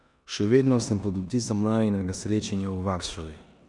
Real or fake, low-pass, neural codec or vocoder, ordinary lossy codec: fake; 10.8 kHz; codec, 16 kHz in and 24 kHz out, 0.9 kbps, LongCat-Audio-Codec, four codebook decoder; none